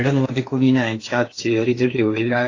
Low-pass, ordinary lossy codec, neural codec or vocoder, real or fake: 7.2 kHz; AAC, 32 kbps; codec, 16 kHz in and 24 kHz out, 0.8 kbps, FocalCodec, streaming, 65536 codes; fake